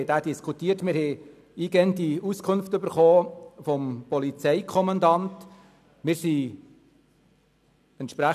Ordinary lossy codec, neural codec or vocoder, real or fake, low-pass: none; none; real; 14.4 kHz